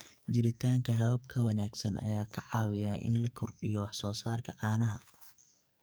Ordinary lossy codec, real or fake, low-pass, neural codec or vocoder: none; fake; none; codec, 44.1 kHz, 2.6 kbps, SNAC